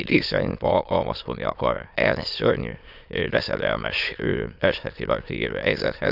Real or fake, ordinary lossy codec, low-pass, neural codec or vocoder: fake; none; 5.4 kHz; autoencoder, 22.05 kHz, a latent of 192 numbers a frame, VITS, trained on many speakers